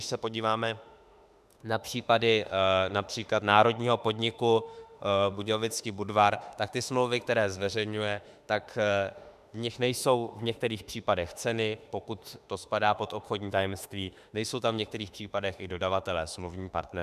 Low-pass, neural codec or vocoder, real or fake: 14.4 kHz; autoencoder, 48 kHz, 32 numbers a frame, DAC-VAE, trained on Japanese speech; fake